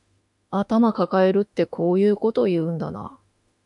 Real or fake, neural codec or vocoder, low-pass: fake; autoencoder, 48 kHz, 32 numbers a frame, DAC-VAE, trained on Japanese speech; 10.8 kHz